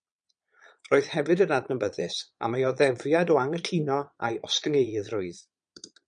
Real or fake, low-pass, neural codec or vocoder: fake; 9.9 kHz; vocoder, 22.05 kHz, 80 mel bands, Vocos